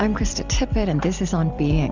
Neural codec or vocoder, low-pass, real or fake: vocoder, 44.1 kHz, 128 mel bands every 256 samples, BigVGAN v2; 7.2 kHz; fake